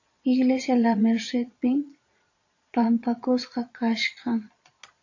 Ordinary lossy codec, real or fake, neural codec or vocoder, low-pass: AAC, 48 kbps; fake; vocoder, 22.05 kHz, 80 mel bands, Vocos; 7.2 kHz